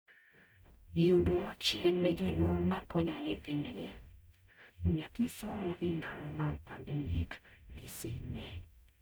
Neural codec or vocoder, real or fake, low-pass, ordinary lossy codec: codec, 44.1 kHz, 0.9 kbps, DAC; fake; none; none